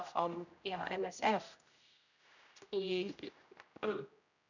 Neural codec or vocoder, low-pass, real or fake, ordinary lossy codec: codec, 16 kHz, 0.5 kbps, X-Codec, HuBERT features, trained on general audio; 7.2 kHz; fake; none